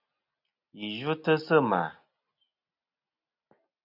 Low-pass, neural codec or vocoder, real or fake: 5.4 kHz; none; real